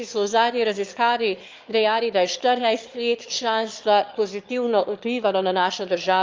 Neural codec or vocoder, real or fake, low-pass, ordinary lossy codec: autoencoder, 22.05 kHz, a latent of 192 numbers a frame, VITS, trained on one speaker; fake; 7.2 kHz; Opus, 32 kbps